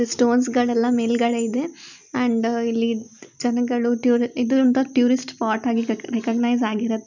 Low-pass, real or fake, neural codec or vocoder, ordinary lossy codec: 7.2 kHz; real; none; none